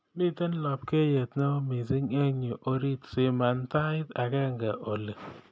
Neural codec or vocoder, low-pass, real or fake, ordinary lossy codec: none; none; real; none